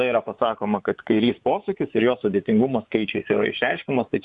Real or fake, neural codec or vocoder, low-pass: fake; vocoder, 24 kHz, 100 mel bands, Vocos; 10.8 kHz